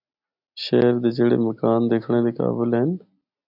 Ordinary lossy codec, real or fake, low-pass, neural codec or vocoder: AAC, 48 kbps; real; 5.4 kHz; none